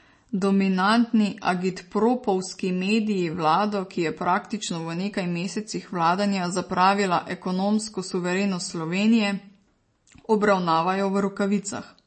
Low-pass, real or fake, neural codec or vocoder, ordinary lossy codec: 10.8 kHz; real; none; MP3, 32 kbps